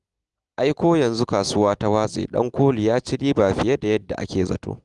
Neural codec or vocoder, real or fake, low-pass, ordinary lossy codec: none; real; 10.8 kHz; Opus, 24 kbps